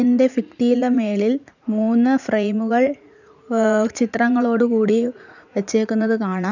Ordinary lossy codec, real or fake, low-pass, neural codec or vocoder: none; fake; 7.2 kHz; vocoder, 44.1 kHz, 80 mel bands, Vocos